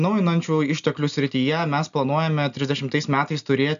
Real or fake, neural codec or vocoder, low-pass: real; none; 7.2 kHz